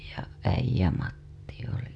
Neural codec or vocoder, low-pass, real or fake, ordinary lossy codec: none; none; real; none